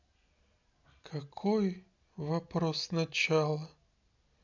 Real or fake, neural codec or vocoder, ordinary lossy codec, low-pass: real; none; none; 7.2 kHz